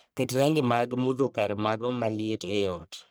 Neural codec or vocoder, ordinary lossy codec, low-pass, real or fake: codec, 44.1 kHz, 1.7 kbps, Pupu-Codec; none; none; fake